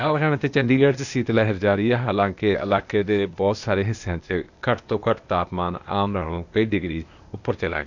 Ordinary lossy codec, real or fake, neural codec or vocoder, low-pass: none; fake; codec, 16 kHz, 0.8 kbps, ZipCodec; 7.2 kHz